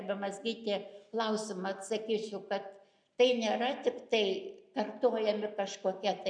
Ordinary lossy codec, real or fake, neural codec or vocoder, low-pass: MP3, 96 kbps; real; none; 9.9 kHz